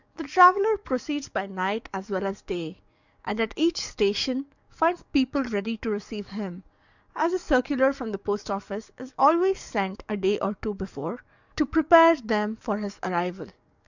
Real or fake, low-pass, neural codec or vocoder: fake; 7.2 kHz; codec, 44.1 kHz, 7.8 kbps, DAC